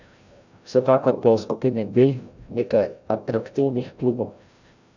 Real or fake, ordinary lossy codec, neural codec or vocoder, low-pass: fake; none; codec, 16 kHz, 0.5 kbps, FreqCodec, larger model; 7.2 kHz